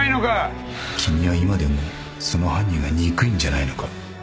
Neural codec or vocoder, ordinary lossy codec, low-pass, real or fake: none; none; none; real